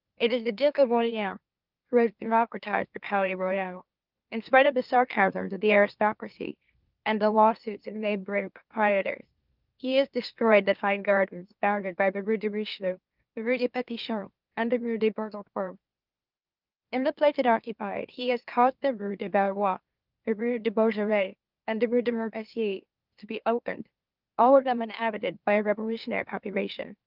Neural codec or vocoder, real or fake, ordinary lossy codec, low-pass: autoencoder, 44.1 kHz, a latent of 192 numbers a frame, MeloTTS; fake; Opus, 24 kbps; 5.4 kHz